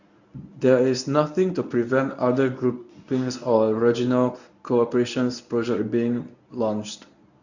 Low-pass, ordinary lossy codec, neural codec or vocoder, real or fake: 7.2 kHz; none; codec, 24 kHz, 0.9 kbps, WavTokenizer, medium speech release version 1; fake